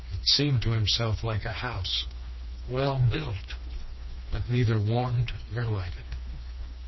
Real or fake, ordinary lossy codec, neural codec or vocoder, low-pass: fake; MP3, 24 kbps; codec, 16 kHz, 2 kbps, FreqCodec, smaller model; 7.2 kHz